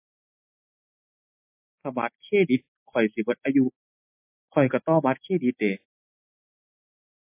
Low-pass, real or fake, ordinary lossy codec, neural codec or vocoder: 3.6 kHz; real; MP3, 24 kbps; none